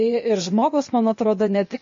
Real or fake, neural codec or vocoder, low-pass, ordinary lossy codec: fake; codec, 16 kHz, 0.8 kbps, ZipCodec; 7.2 kHz; MP3, 32 kbps